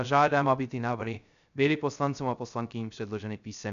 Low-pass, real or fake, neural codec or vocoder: 7.2 kHz; fake; codec, 16 kHz, 0.3 kbps, FocalCodec